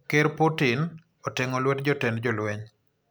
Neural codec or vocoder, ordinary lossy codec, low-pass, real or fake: none; none; none; real